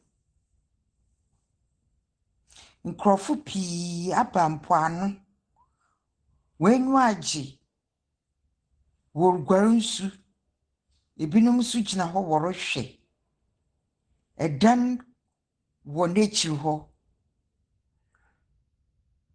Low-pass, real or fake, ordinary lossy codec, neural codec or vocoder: 9.9 kHz; real; Opus, 16 kbps; none